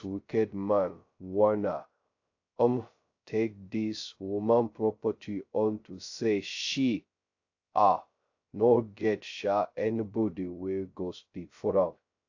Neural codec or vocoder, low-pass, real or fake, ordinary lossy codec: codec, 16 kHz, 0.2 kbps, FocalCodec; 7.2 kHz; fake; AAC, 48 kbps